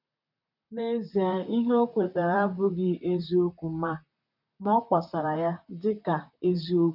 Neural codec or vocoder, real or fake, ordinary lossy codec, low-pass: vocoder, 44.1 kHz, 128 mel bands, Pupu-Vocoder; fake; none; 5.4 kHz